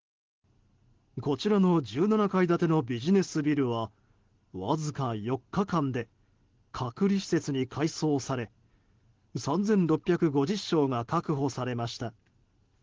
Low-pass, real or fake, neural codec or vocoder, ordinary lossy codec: 7.2 kHz; real; none; Opus, 16 kbps